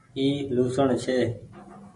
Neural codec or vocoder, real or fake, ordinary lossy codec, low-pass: none; real; MP3, 48 kbps; 10.8 kHz